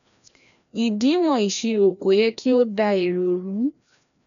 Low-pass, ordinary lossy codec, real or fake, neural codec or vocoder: 7.2 kHz; none; fake; codec, 16 kHz, 1 kbps, FreqCodec, larger model